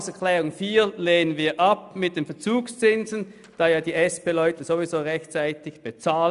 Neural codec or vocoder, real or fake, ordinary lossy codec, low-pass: none; real; none; 10.8 kHz